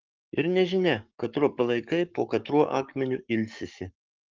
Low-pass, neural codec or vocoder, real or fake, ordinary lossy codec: 7.2 kHz; codec, 44.1 kHz, 7.8 kbps, Pupu-Codec; fake; Opus, 32 kbps